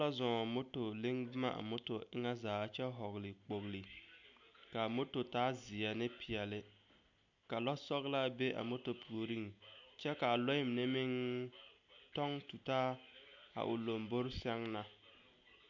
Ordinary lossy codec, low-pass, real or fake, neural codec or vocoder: MP3, 64 kbps; 7.2 kHz; real; none